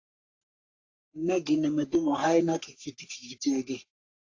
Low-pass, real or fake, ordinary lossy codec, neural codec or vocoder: 7.2 kHz; fake; AAC, 48 kbps; codec, 44.1 kHz, 3.4 kbps, Pupu-Codec